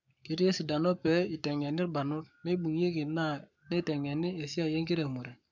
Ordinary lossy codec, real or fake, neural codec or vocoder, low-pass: none; fake; codec, 44.1 kHz, 7.8 kbps, Pupu-Codec; 7.2 kHz